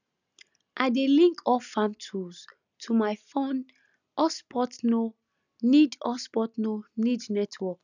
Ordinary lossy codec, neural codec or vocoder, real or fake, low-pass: none; none; real; 7.2 kHz